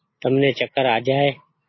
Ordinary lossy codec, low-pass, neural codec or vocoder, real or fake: MP3, 24 kbps; 7.2 kHz; none; real